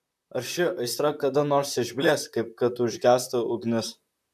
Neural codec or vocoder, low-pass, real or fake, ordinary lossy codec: vocoder, 44.1 kHz, 128 mel bands, Pupu-Vocoder; 14.4 kHz; fake; MP3, 96 kbps